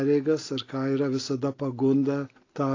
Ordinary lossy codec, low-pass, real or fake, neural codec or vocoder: AAC, 32 kbps; 7.2 kHz; real; none